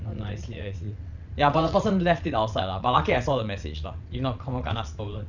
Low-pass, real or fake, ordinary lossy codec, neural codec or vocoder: 7.2 kHz; fake; none; vocoder, 22.05 kHz, 80 mel bands, WaveNeXt